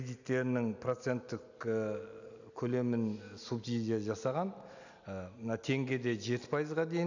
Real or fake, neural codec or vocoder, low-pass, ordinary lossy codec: real; none; 7.2 kHz; none